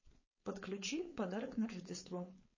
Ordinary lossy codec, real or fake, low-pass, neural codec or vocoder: MP3, 32 kbps; fake; 7.2 kHz; codec, 16 kHz, 4.8 kbps, FACodec